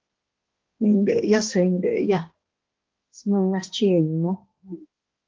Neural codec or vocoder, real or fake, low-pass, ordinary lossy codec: codec, 16 kHz, 1 kbps, X-Codec, HuBERT features, trained on balanced general audio; fake; 7.2 kHz; Opus, 16 kbps